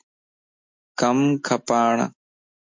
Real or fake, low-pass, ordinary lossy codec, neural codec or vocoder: real; 7.2 kHz; MP3, 48 kbps; none